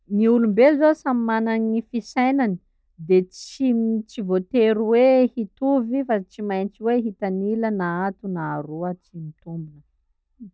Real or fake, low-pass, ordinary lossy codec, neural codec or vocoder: real; none; none; none